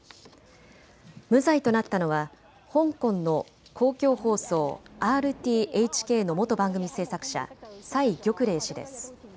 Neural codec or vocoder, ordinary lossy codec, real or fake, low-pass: none; none; real; none